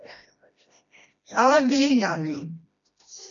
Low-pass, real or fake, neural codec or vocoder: 7.2 kHz; fake; codec, 16 kHz, 1 kbps, FreqCodec, smaller model